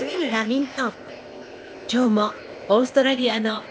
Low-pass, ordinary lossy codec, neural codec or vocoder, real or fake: none; none; codec, 16 kHz, 0.8 kbps, ZipCodec; fake